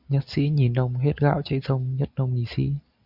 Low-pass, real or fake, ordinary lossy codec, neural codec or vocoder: 5.4 kHz; real; Opus, 64 kbps; none